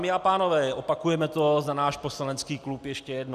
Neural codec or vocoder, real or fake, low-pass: none; real; 14.4 kHz